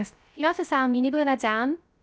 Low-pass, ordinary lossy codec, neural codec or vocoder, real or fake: none; none; codec, 16 kHz, 0.3 kbps, FocalCodec; fake